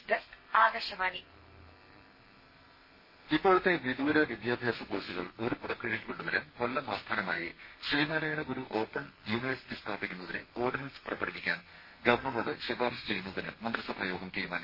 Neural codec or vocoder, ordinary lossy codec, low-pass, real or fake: codec, 32 kHz, 1.9 kbps, SNAC; MP3, 24 kbps; 5.4 kHz; fake